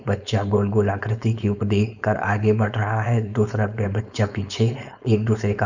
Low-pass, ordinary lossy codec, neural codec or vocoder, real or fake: 7.2 kHz; none; codec, 16 kHz, 4.8 kbps, FACodec; fake